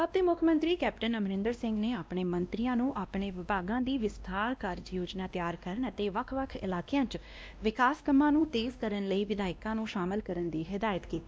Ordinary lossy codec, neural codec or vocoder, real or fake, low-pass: none; codec, 16 kHz, 1 kbps, X-Codec, WavLM features, trained on Multilingual LibriSpeech; fake; none